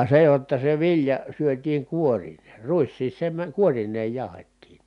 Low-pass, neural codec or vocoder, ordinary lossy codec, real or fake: 10.8 kHz; none; none; real